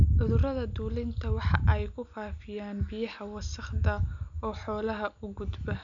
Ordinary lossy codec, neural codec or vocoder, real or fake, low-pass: none; none; real; 7.2 kHz